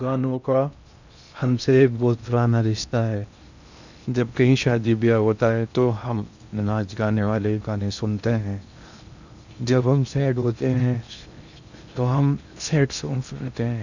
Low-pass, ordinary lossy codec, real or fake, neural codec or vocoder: 7.2 kHz; none; fake; codec, 16 kHz in and 24 kHz out, 0.6 kbps, FocalCodec, streaming, 2048 codes